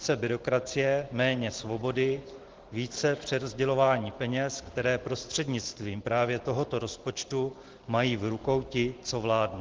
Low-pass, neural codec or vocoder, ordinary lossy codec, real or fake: 7.2 kHz; none; Opus, 16 kbps; real